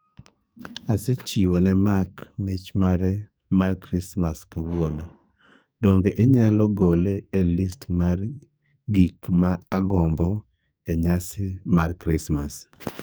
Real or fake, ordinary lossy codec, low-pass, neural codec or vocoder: fake; none; none; codec, 44.1 kHz, 2.6 kbps, SNAC